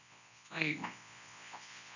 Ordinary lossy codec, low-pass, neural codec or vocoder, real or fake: none; 7.2 kHz; codec, 24 kHz, 0.9 kbps, WavTokenizer, large speech release; fake